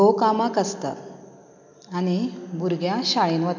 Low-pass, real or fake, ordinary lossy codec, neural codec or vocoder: 7.2 kHz; real; none; none